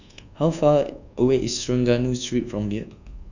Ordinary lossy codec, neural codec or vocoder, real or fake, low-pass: none; codec, 24 kHz, 1.2 kbps, DualCodec; fake; 7.2 kHz